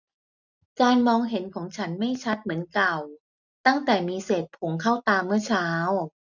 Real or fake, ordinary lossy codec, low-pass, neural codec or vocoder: real; none; 7.2 kHz; none